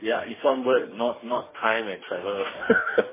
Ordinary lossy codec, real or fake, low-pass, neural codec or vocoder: MP3, 16 kbps; fake; 3.6 kHz; codec, 44.1 kHz, 2.6 kbps, DAC